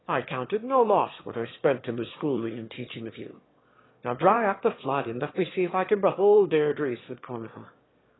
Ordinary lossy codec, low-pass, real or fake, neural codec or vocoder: AAC, 16 kbps; 7.2 kHz; fake; autoencoder, 22.05 kHz, a latent of 192 numbers a frame, VITS, trained on one speaker